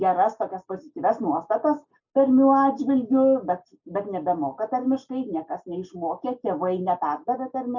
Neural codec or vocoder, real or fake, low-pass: none; real; 7.2 kHz